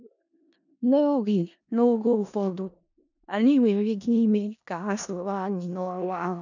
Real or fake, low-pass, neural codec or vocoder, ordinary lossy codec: fake; 7.2 kHz; codec, 16 kHz in and 24 kHz out, 0.4 kbps, LongCat-Audio-Codec, four codebook decoder; none